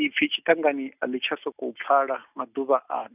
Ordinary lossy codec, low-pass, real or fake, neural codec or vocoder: none; 3.6 kHz; real; none